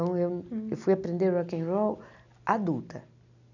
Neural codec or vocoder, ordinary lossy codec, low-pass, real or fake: none; none; 7.2 kHz; real